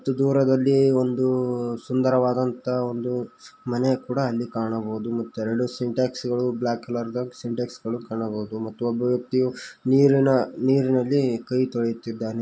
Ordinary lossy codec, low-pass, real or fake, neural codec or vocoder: none; none; real; none